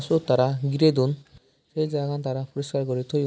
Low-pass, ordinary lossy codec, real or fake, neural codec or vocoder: none; none; real; none